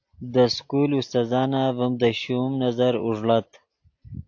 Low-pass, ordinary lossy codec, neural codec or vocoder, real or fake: 7.2 kHz; AAC, 48 kbps; none; real